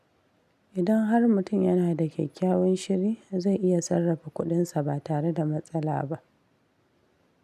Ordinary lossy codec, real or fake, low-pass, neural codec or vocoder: none; real; 14.4 kHz; none